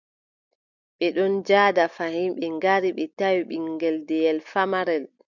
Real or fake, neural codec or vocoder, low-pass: real; none; 7.2 kHz